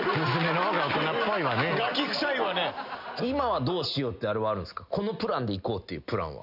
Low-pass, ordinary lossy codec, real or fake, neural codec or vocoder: 5.4 kHz; none; real; none